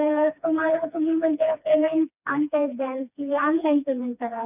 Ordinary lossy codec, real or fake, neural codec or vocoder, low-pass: none; fake; codec, 16 kHz, 2 kbps, FreqCodec, smaller model; 3.6 kHz